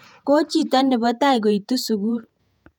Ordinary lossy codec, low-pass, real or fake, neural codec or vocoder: none; 19.8 kHz; fake; vocoder, 48 kHz, 128 mel bands, Vocos